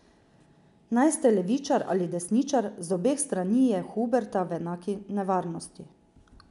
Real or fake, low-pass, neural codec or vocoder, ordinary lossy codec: real; 10.8 kHz; none; none